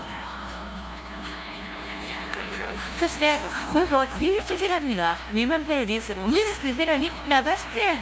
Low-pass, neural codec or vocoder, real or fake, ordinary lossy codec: none; codec, 16 kHz, 0.5 kbps, FunCodec, trained on LibriTTS, 25 frames a second; fake; none